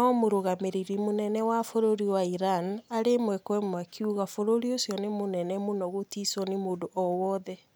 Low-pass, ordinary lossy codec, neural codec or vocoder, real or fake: none; none; none; real